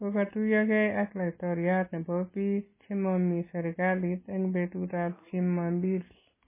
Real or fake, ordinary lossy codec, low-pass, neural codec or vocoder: real; MP3, 16 kbps; 3.6 kHz; none